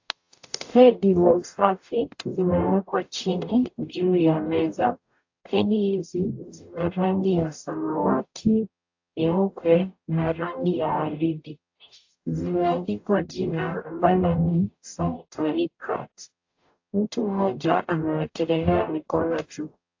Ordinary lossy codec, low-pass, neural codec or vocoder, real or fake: AAC, 48 kbps; 7.2 kHz; codec, 44.1 kHz, 0.9 kbps, DAC; fake